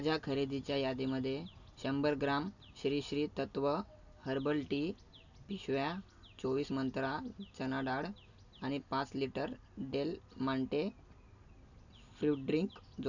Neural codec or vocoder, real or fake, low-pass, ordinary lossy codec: none; real; 7.2 kHz; none